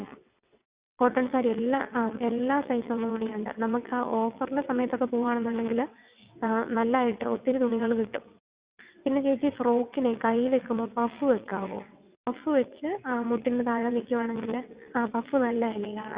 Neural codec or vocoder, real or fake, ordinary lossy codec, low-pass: vocoder, 22.05 kHz, 80 mel bands, WaveNeXt; fake; Opus, 64 kbps; 3.6 kHz